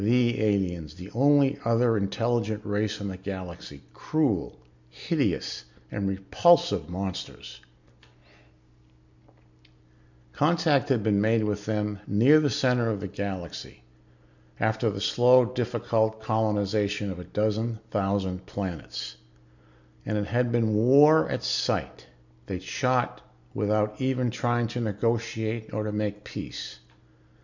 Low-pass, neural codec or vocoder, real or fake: 7.2 kHz; none; real